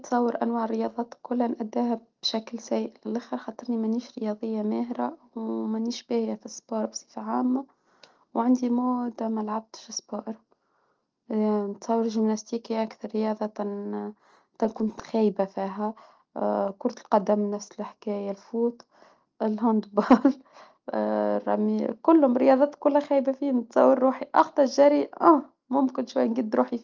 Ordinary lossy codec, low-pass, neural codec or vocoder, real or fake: Opus, 24 kbps; 7.2 kHz; none; real